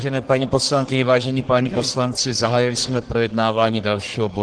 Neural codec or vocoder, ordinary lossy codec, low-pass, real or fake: codec, 44.1 kHz, 1.7 kbps, Pupu-Codec; Opus, 16 kbps; 9.9 kHz; fake